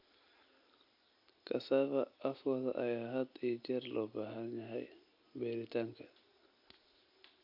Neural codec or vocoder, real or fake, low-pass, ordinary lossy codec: none; real; 5.4 kHz; AAC, 48 kbps